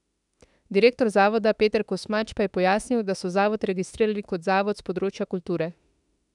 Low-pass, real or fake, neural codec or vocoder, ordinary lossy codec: 10.8 kHz; fake; autoencoder, 48 kHz, 32 numbers a frame, DAC-VAE, trained on Japanese speech; none